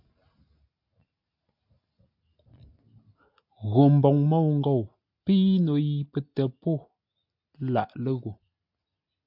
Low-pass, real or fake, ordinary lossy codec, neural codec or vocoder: 5.4 kHz; real; AAC, 48 kbps; none